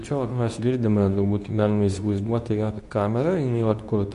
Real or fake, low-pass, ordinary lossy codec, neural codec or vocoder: fake; 10.8 kHz; AAC, 96 kbps; codec, 24 kHz, 0.9 kbps, WavTokenizer, medium speech release version 2